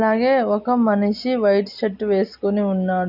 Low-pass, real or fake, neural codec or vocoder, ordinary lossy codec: 5.4 kHz; real; none; none